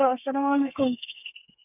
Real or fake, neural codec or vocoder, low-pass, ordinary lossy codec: fake; codec, 32 kHz, 1.9 kbps, SNAC; 3.6 kHz; none